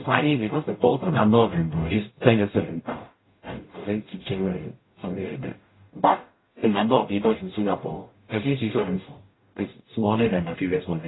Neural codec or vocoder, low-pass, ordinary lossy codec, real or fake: codec, 44.1 kHz, 0.9 kbps, DAC; 7.2 kHz; AAC, 16 kbps; fake